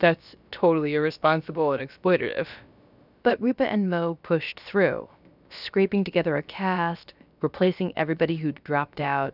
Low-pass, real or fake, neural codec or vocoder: 5.4 kHz; fake; codec, 16 kHz, about 1 kbps, DyCAST, with the encoder's durations